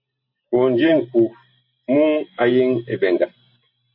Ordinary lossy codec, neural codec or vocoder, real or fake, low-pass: MP3, 32 kbps; none; real; 5.4 kHz